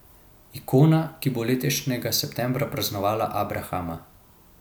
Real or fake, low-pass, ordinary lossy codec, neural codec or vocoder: real; none; none; none